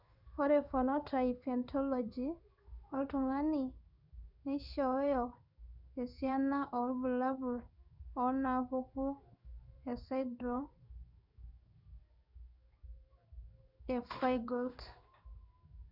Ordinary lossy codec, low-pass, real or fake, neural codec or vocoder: none; 5.4 kHz; fake; codec, 16 kHz in and 24 kHz out, 1 kbps, XY-Tokenizer